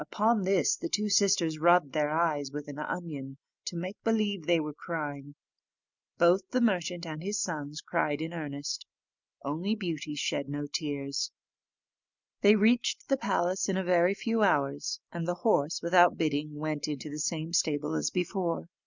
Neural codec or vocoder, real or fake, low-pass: none; real; 7.2 kHz